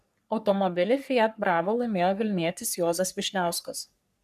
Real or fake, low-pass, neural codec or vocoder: fake; 14.4 kHz; codec, 44.1 kHz, 3.4 kbps, Pupu-Codec